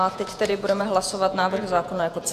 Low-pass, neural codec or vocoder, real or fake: 14.4 kHz; vocoder, 48 kHz, 128 mel bands, Vocos; fake